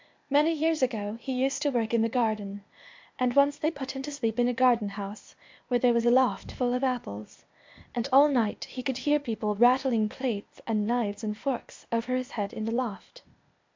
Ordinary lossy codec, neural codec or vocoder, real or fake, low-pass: MP3, 48 kbps; codec, 16 kHz, 0.8 kbps, ZipCodec; fake; 7.2 kHz